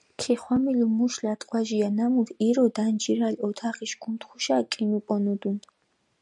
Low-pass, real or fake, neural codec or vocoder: 10.8 kHz; real; none